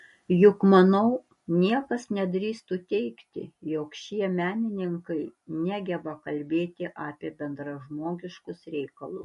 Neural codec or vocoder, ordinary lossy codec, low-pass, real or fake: none; MP3, 48 kbps; 14.4 kHz; real